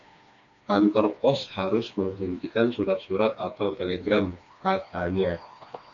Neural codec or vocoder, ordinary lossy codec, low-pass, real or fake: codec, 16 kHz, 2 kbps, FreqCodec, smaller model; MP3, 64 kbps; 7.2 kHz; fake